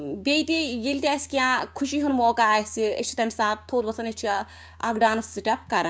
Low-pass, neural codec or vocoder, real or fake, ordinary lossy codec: none; codec, 16 kHz, 6 kbps, DAC; fake; none